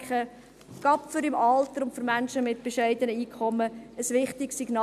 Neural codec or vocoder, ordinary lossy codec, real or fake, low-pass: none; none; real; 14.4 kHz